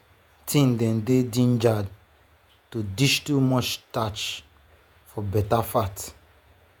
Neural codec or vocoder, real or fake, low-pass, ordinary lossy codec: vocoder, 48 kHz, 128 mel bands, Vocos; fake; none; none